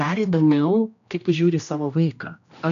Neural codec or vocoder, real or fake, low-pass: codec, 16 kHz, 1 kbps, X-Codec, HuBERT features, trained on general audio; fake; 7.2 kHz